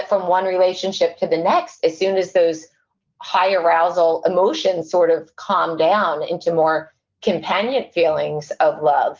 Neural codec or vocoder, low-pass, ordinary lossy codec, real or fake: none; 7.2 kHz; Opus, 32 kbps; real